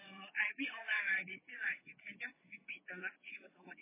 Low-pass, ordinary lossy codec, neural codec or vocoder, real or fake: 3.6 kHz; MP3, 16 kbps; vocoder, 22.05 kHz, 80 mel bands, WaveNeXt; fake